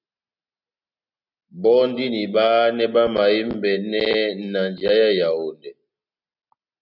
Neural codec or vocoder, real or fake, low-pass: none; real; 5.4 kHz